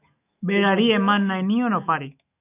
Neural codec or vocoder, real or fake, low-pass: autoencoder, 48 kHz, 128 numbers a frame, DAC-VAE, trained on Japanese speech; fake; 3.6 kHz